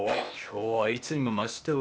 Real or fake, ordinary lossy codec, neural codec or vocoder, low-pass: fake; none; codec, 16 kHz, 0.8 kbps, ZipCodec; none